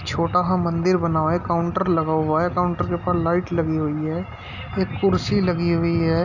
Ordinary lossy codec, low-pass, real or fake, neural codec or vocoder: none; 7.2 kHz; real; none